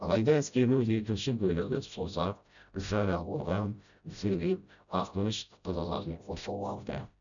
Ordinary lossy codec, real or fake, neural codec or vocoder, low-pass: none; fake; codec, 16 kHz, 0.5 kbps, FreqCodec, smaller model; 7.2 kHz